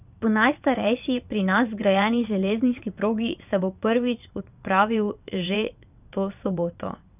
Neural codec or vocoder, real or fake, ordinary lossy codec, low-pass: vocoder, 22.05 kHz, 80 mel bands, WaveNeXt; fake; none; 3.6 kHz